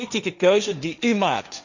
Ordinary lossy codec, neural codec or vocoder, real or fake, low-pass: none; codec, 16 kHz, 1.1 kbps, Voila-Tokenizer; fake; 7.2 kHz